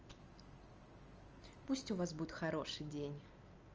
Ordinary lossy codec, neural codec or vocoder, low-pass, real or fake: Opus, 24 kbps; none; 7.2 kHz; real